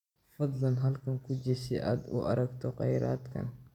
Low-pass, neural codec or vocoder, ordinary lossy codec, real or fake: 19.8 kHz; none; none; real